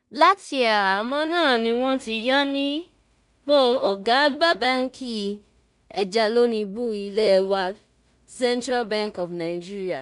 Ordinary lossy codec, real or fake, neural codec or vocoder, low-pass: none; fake; codec, 16 kHz in and 24 kHz out, 0.4 kbps, LongCat-Audio-Codec, two codebook decoder; 10.8 kHz